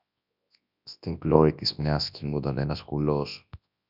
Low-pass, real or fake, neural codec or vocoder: 5.4 kHz; fake; codec, 24 kHz, 0.9 kbps, WavTokenizer, large speech release